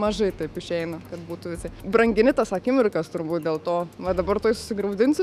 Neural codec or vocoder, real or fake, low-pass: autoencoder, 48 kHz, 128 numbers a frame, DAC-VAE, trained on Japanese speech; fake; 14.4 kHz